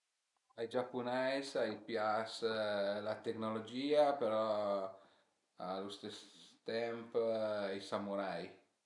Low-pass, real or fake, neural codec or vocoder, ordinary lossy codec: 10.8 kHz; real; none; none